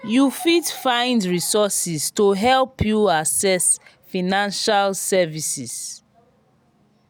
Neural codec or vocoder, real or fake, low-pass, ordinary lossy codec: none; real; none; none